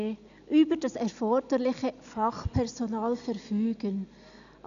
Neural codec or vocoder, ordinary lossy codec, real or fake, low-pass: none; none; real; 7.2 kHz